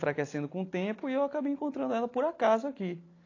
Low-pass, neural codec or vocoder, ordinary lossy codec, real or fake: 7.2 kHz; none; AAC, 32 kbps; real